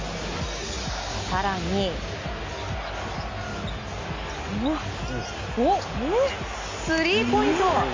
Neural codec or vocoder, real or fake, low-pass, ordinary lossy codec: none; real; 7.2 kHz; MP3, 48 kbps